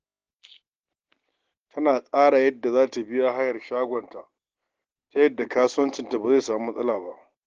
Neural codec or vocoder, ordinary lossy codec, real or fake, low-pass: none; Opus, 16 kbps; real; 7.2 kHz